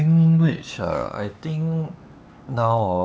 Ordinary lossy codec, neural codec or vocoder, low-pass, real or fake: none; codec, 16 kHz, 4 kbps, X-Codec, HuBERT features, trained on LibriSpeech; none; fake